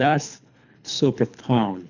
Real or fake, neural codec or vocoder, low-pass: fake; codec, 24 kHz, 3 kbps, HILCodec; 7.2 kHz